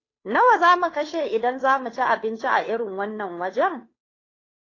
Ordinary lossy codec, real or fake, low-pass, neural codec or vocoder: AAC, 32 kbps; fake; 7.2 kHz; codec, 16 kHz, 2 kbps, FunCodec, trained on Chinese and English, 25 frames a second